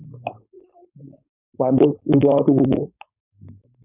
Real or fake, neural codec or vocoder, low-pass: fake; codec, 16 kHz, 4.8 kbps, FACodec; 3.6 kHz